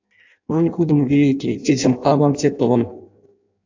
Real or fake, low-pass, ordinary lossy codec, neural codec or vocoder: fake; 7.2 kHz; AAC, 48 kbps; codec, 16 kHz in and 24 kHz out, 0.6 kbps, FireRedTTS-2 codec